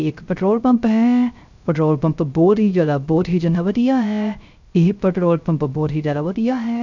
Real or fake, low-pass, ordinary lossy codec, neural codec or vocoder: fake; 7.2 kHz; none; codec, 16 kHz, 0.3 kbps, FocalCodec